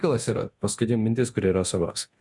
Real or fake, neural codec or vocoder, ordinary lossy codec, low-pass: fake; codec, 24 kHz, 0.9 kbps, DualCodec; Opus, 64 kbps; 10.8 kHz